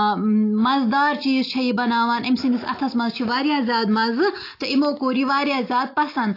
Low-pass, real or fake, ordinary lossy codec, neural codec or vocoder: 5.4 kHz; real; AAC, 32 kbps; none